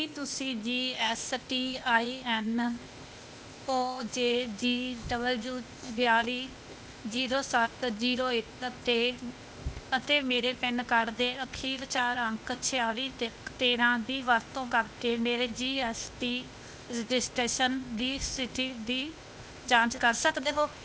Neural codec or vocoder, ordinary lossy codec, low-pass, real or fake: codec, 16 kHz, 0.8 kbps, ZipCodec; none; none; fake